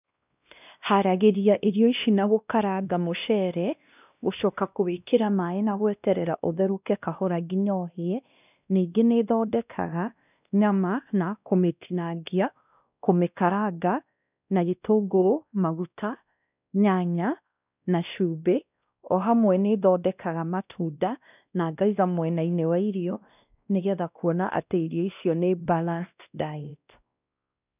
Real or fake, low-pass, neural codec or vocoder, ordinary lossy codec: fake; 3.6 kHz; codec, 16 kHz, 1 kbps, X-Codec, WavLM features, trained on Multilingual LibriSpeech; none